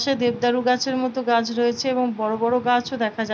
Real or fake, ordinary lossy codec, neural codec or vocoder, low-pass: real; none; none; none